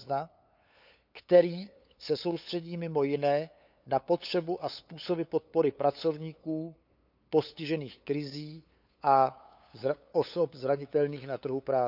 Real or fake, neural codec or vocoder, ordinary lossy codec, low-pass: fake; codec, 16 kHz, 8 kbps, FunCodec, trained on LibriTTS, 25 frames a second; none; 5.4 kHz